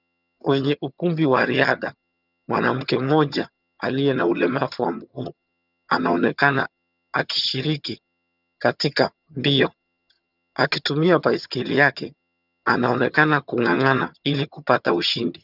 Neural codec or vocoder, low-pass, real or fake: vocoder, 22.05 kHz, 80 mel bands, HiFi-GAN; 5.4 kHz; fake